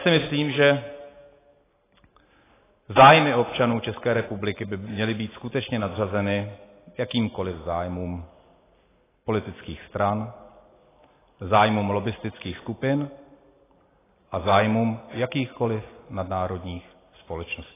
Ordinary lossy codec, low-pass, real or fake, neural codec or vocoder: AAC, 16 kbps; 3.6 kHz; real; none